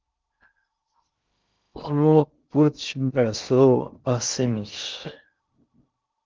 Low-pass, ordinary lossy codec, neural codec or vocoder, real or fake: 7.2 kHz; Opus, 24 kbps; codec, 16 kHz in and 24 kHz out, 0.6 kbps, FocalCodec, streaming, 2048 codes; fake